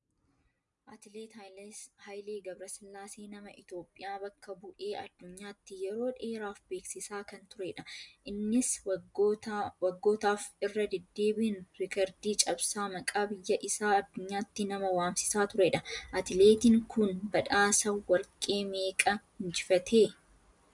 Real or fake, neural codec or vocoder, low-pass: real; none; 10.8 kHz